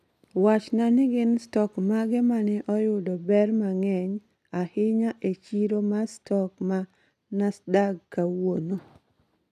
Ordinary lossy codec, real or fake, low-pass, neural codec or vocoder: none; real; 14.4 kHz; none